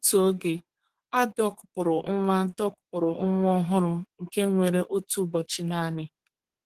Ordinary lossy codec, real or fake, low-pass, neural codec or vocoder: Opus, 16 kbps; fake; 14.4 kHz; codec, 32 kHz, 1.9 kbps, SNAC